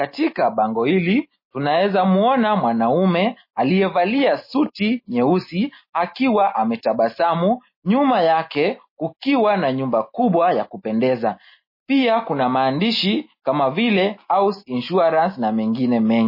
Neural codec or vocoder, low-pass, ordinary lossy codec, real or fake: none; 5.4 kHz; MP3, 24 kbps; real